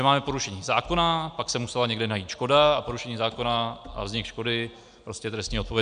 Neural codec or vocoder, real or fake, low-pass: none; real; 9.9 kHz